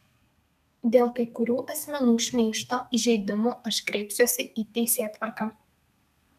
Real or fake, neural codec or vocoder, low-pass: fake; codec, 32 kHz, 1.9 kbps, SNAC; 14.4 kHz